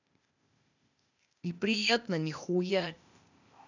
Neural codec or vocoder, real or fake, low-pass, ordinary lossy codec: codec, 16 kHz, 0.8 kbps, ZipCodec; fake; 7.2 kHz; none